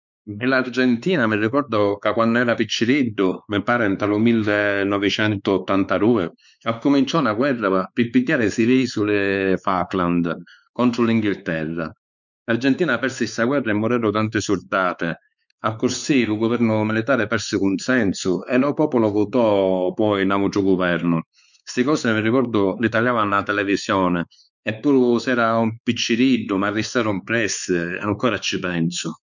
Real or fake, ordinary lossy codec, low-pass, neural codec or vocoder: fake; none; 7.2 kHz; codec, 16 kHz, 4 kbps, X-Codec, WavLM features, trained on Multilingual LibriSpeech